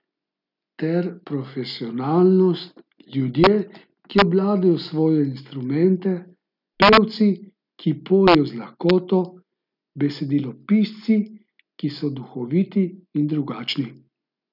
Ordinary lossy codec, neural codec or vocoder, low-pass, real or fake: none; none; 5.4 kHz; real